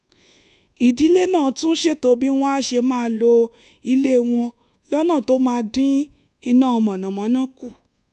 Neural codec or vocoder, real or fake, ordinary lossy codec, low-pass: codec, 24 kHz, 1.2 kbps, DualCodec; fake; none; 10.8 kHz